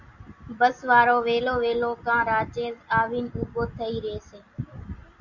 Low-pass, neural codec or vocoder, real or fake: 7.2 kHz; none; real